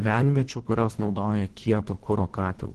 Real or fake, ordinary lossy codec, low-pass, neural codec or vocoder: fake; Opus, 16 kbps; 10.8 kHz; codec, 24 kHz, 1.5 kbps, HILCodec